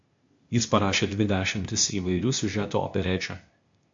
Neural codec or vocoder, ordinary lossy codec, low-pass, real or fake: codec, 16 kHz, 0.8 kbps, ZipCodec; MP3, 48 kbps; 7.2 kHz; fake